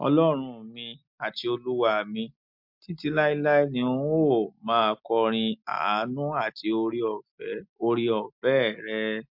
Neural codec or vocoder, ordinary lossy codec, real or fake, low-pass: none; MP3, 48 kbps; real; 5.4 kHz